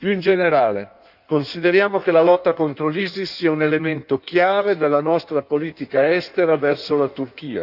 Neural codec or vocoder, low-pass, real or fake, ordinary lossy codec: codec, 16 kHz in and 24 kHz out, 1.1 kbps, FireRedTTS-2 codec; 5.4 kHz; fake; none